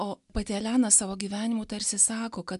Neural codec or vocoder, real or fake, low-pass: none; real; 10.8 kHz